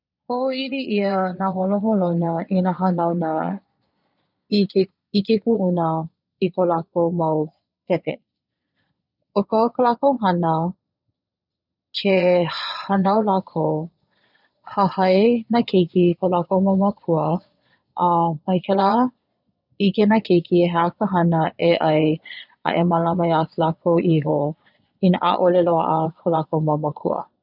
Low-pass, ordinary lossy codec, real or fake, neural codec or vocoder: 5.4 kHz; none; fake; vocoder, 22.05 kHz, 80 mel bands, Vocos